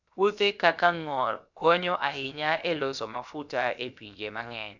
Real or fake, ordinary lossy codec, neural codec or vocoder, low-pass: fake; none; codec, 16 kHz, 0.7 kbps, FocalCodec; 7.2 kHz